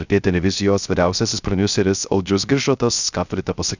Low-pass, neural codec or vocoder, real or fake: 7.2 kHz; codec, 16 kHz, 0.3 kbps, FocalCodec; fake